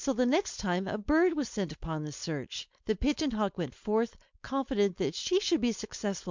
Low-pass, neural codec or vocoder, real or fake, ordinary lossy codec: 7.2 kHz; codec, 16 kHz, 4.8 kbps, FACodec; fake; MP3, 64 kbps